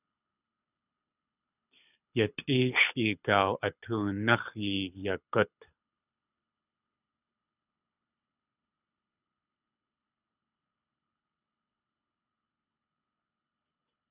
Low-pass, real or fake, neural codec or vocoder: 3.6 kHz; fake; codec, 24 kHz, 6 kbps, HILCodec